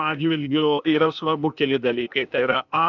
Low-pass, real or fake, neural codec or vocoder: 7.2 kHz; fake; codec, 16 kHz, 0.8 kbps, ZipCodec